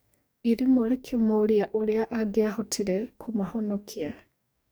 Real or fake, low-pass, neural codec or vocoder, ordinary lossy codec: fake; none; codec, 44.1 kHz, 2.6 kbps, DAC; none